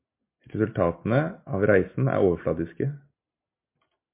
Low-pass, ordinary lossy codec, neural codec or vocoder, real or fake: 3.6 kHz; MP3, 24 kbps; none; real